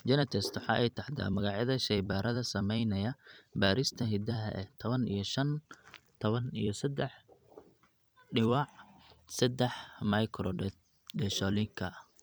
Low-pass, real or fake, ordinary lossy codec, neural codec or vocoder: none; fake; none; vocoder, 44.1 kHz, 128 mel bands every 256 samples, BigVGAN v2